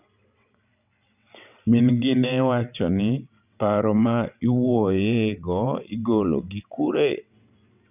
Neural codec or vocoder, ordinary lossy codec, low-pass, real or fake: vocoder, 22.05 kHz, 80 mel bands, WaveNeXt; none; 3.6 kHz; fake